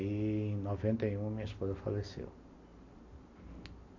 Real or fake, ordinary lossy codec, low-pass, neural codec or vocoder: real; AAC, 48 kbps; 7.2 kHz; none